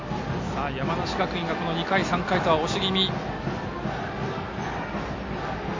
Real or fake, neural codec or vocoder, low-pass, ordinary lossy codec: real; none; 7.2 kHz; MP3, 48 kbps